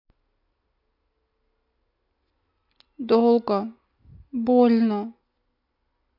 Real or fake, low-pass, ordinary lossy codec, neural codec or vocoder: real; 5.4 kHz; MP3, 48 kbps; none